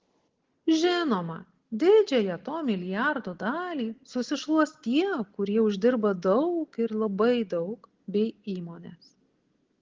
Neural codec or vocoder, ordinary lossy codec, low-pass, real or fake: none; Opus, 16 kbps; 7.2 kHz; real